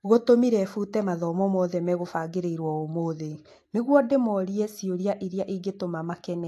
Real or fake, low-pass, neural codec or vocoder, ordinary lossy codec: real; 14.4 kHz; none; AAC, 64 kbps